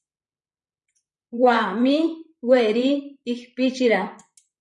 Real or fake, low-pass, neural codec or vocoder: fake; 10.8 kHz; vocoder, 44.1 kHz, 128 mel bands, Pupu-Vocoder